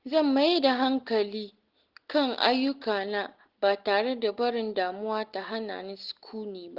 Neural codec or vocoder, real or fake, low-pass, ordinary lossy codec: none; real; 5.4 kHz; Opus, 16 kbps